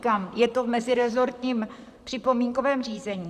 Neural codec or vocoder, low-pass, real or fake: vocoder, 44.1 kHz, 128 mel bands, Pupu-Vocoder; 14.4 kHz; fake